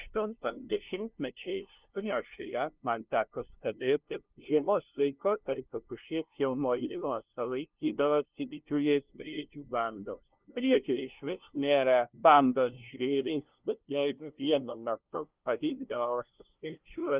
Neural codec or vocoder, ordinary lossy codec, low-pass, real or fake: codec, 16 kHz, 0.5 kbps, FunCodec, trained on LibriTTS, 25 frames a second; Opus, 32 kbps; 3.6 kHz; fake